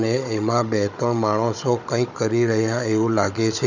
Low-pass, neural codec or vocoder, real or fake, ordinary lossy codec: 7.2 kHz; codec, 16 kHz, 16 kbps, FunCodec, trained on Chinese and English, 50 frames a second; fake; none